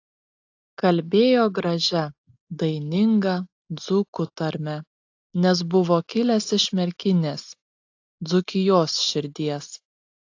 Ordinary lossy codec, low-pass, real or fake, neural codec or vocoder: AAC, 48 kbps; 7.2 kHz; real; none